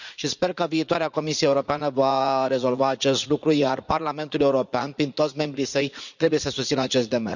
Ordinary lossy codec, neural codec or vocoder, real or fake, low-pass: none; vocoder, 44.1 kHz, 80 mel bands, Vocos; fake; 7.2 kHz